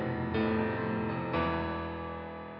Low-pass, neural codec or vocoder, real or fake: 5.4 kHz; none; real